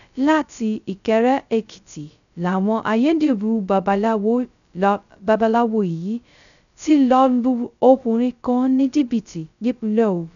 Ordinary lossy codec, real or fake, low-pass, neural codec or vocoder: none; fake; 7.2 kHz; codec, 16 kHz, 0.2 kbps, FocalCodec